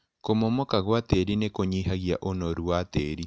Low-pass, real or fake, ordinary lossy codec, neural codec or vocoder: none; real; none; none